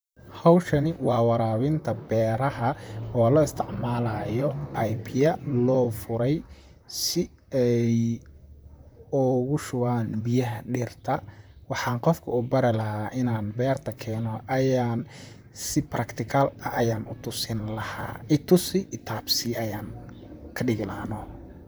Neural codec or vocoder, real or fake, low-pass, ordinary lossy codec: vocoder, 44.1 kHz, 128 mel bands, Pupu-Vocoder; fake; none; none